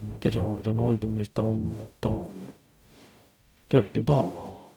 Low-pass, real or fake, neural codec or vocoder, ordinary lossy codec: 19.8 kHz; fake; codec, 44.1 kHz, 0.9 kbps, DAC; none